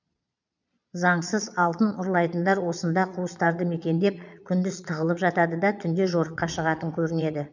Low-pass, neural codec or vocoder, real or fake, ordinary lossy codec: 7.2 kHz; vocoder, 22.05 kHz, 80 mel bands, Vocos; fake; none